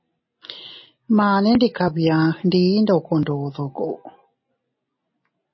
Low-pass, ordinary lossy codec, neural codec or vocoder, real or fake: 7.2 kHz; MP3, 24 kbps; none; real